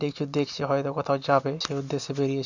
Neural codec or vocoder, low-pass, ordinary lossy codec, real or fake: none; 7.2 kHz; none; real